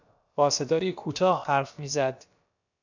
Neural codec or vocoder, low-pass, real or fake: codec, 16 kHz, about 1 kbps, DyCAST, with the encoder's durations; 7.2 kHz; fake